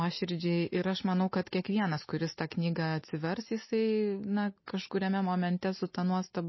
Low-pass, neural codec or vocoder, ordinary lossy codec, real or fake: 7.2 kHz; none; MP3, 24 kbps; real